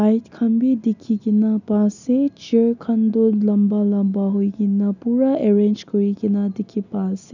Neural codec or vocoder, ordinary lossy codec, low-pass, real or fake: none; none; 7.2 kHz; real